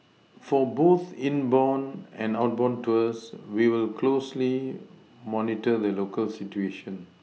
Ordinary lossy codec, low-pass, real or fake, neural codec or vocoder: none; none; real; none